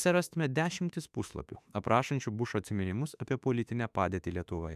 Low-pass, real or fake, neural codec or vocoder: 14.4 kHz; fake; autoencoder, 48 kHz, 32 numbers a frame, DAC-VAE, trained on Japanese speech